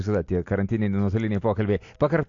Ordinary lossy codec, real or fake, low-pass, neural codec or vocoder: AAC, 48 kbps; real; 7.2 kHz; none